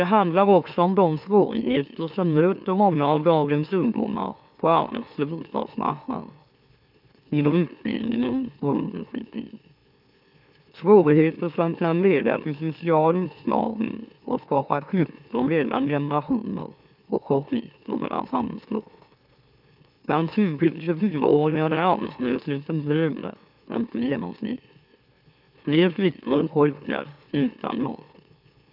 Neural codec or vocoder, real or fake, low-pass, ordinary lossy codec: autoencoder, 44.1 kHz, a latent of 192 numbers a frame, MeloTTS; fake; 5.4 kHz; none